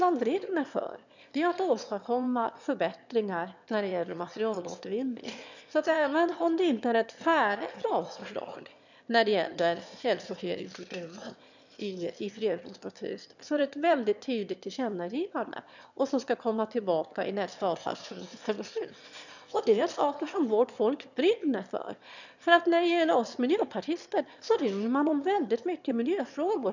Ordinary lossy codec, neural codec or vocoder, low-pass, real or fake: none; autoencoder, 22.05 kHz, a latent of 192 numbers a frame, VITS, trained on one speaker; 7.2 kHz; fake